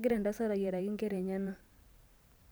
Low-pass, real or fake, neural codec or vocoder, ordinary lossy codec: none; fake; vocoder, 44.1 kHz, 128 mel bands every 512 samples, BigVGAN v2; none